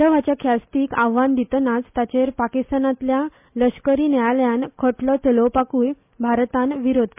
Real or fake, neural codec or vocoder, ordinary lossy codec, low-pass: real; none; MP3, 32 kbps; 3.6 kHz